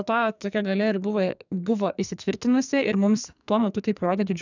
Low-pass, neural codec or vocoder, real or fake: 7.2 kHz; codec, 44.1 kHz, 2.6 kbps, SNAC; fake